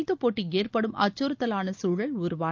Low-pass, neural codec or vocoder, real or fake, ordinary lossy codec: 7.2 kHz; none; real; Opus, 24 kbps